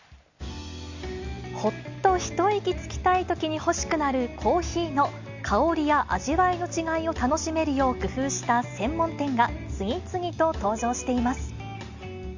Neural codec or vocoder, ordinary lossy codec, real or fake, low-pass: none; Opus, 64 kbps; real; 7.2 kHz